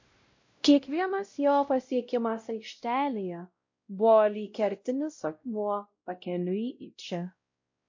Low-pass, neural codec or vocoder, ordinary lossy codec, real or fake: 7.2 kHz; codec, 16 kHz, 0.5 kbps, X-Codec, WavLM features, trained on Multilingual LibriSpeech; MP3, 48 kbps; fake